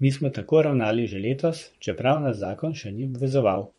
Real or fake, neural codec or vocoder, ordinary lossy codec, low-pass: fake; vocoder, 44.1 kHz, 128 mel bands, Pupu-Vocoder; MP3, 48 kbps; 19.8 kHz